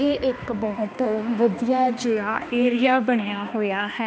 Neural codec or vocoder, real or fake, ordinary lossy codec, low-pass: codec, 16 kHz, 2 kbps, X-Codec, HuBERT features, trained on balanced general audio; fake; none; none